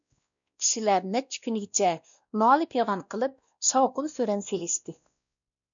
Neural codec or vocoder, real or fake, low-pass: codec, 16 kHz, 1 kbps, X-Codec, WavLM features, trained on Multilingual LibriSpeech; fake; 7.2 kHz